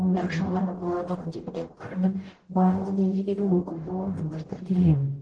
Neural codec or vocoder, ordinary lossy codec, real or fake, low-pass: codec, 44.1 kHz, 0.9 kbps, DAC; Opus, 16 kbps; fake; 9.9 kHz